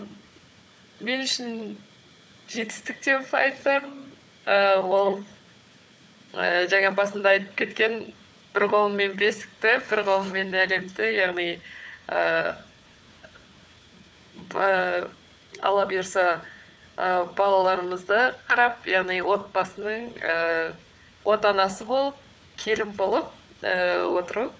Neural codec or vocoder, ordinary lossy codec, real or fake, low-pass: codec, 16 kHz, 4 kbps, FunCodec, trained on Chinese and English, 50 frames a second; none; fake; none